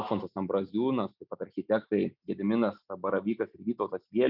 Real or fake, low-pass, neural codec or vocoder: real; 5.4 kHz; none